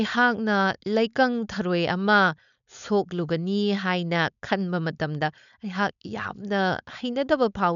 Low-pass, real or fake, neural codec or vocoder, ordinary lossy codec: 7.2 kHz; fake; codec, 16 kHz, 4.8 kbps, FACodec; none